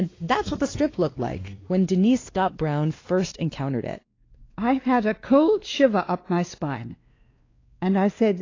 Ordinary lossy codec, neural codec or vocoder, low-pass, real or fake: AAC, 32 kbps; codec, 16 kHz, 2 kbps, X-Codec, WavLM features, trained on Multilingual LibriSpeech; 7.2 kHz; fake